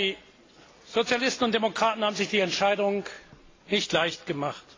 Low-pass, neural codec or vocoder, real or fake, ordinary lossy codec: 7.2 kHz; none; real; AAC, 32 kbps